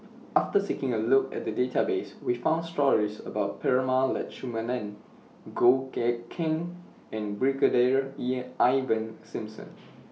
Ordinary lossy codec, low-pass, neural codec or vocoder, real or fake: none; none; none; real